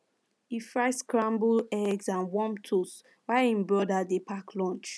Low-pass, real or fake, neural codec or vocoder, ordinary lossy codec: none; real; none; none